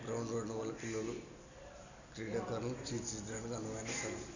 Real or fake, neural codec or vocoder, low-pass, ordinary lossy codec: real; none; 7.2 kHz; none